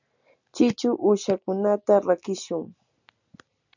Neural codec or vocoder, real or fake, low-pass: none; real; 7.2 kHz